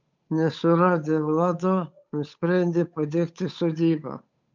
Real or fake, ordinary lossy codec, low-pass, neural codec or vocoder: fake; MP3, 64 kbps; 7.2 kHz; codec, 16 kHz, 8 kbps, FunCodec, trained on Chinese and English, 25 frames a second